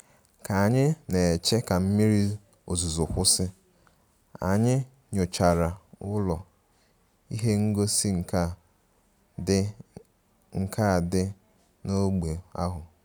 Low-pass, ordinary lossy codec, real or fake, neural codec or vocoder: none; none; real; none